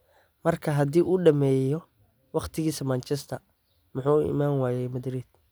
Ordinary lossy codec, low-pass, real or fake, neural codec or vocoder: none; none; real; none